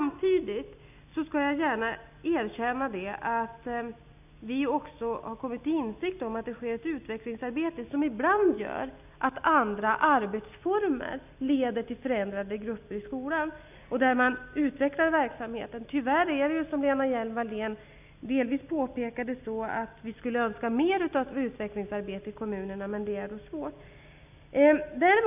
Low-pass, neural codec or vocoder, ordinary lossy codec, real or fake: 3.6 kHz; none; none; real